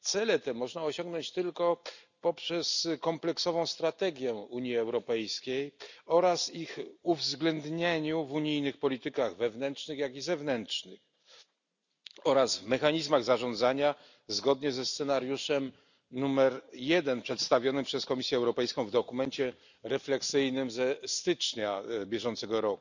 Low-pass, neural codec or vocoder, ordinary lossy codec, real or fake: 7.2 kHz; none; none; real